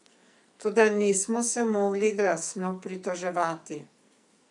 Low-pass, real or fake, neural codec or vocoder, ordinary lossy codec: 10.8 kHz; fake; codec, 44.1 kHz, 2.6 kbps, SNAC; none